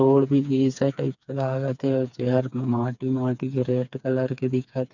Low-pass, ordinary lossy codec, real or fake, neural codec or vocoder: 7.2 kHz; none; fake; codec, 16 kHz, 4 kbps, FreqCodec, smaller model